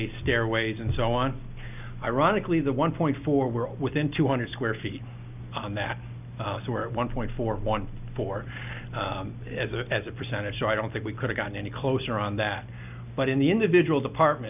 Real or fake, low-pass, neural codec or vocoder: real; 3.6 kHz; none